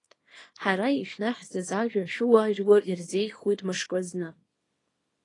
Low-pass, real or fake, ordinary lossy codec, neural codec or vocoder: 10.8 kHz; fake; AAC, 32 kbps; codec, 24 kHz, 0.9 kbps, WavTokenizer, small release